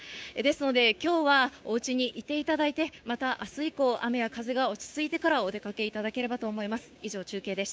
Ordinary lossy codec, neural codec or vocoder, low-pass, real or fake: none; codec, 16 kHz, 6 kbps, DAC; none; fake